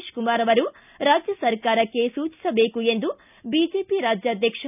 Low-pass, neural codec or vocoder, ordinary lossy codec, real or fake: 3.6 kHz; none; none; real